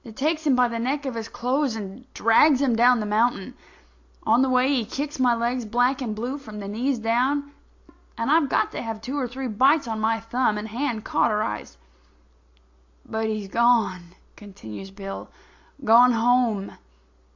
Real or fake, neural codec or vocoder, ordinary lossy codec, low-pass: real; none; AAC, 48 kbps; 7.2 kHz